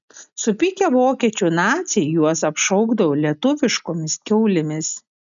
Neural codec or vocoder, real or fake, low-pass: none; real; 7.2 kHz